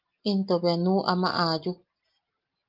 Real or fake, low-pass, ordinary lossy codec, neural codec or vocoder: real; 5.4 kHz; Opus, 24 kbps; none